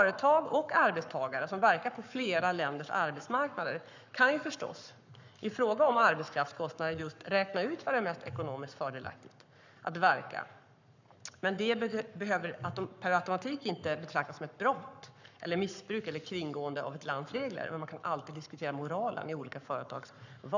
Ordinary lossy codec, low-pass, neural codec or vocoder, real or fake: none; 7.2 kHz; codec, 44.1 kHz, 7.8 kbps, Pupu-Codec; fake